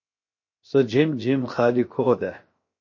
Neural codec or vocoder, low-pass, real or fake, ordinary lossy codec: codec, 16 kHz, 0.7 kbps, FocalCodec; 7.2 kHz; fake; MP3, 32 kbps